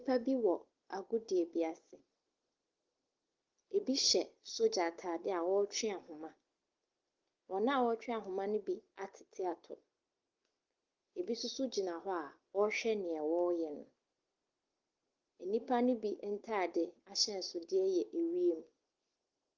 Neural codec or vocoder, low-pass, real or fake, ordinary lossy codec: none; 7.2 kHz; real; Opus, 16 kbps